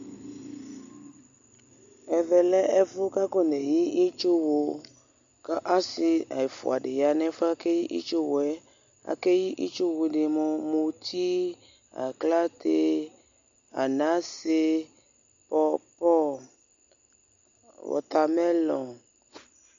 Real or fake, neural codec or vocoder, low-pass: real; none; 7.2 kHz